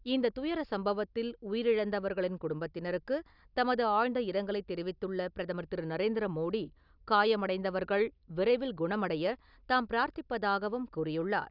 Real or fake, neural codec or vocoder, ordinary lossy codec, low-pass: real; none; none; 5.4 kHz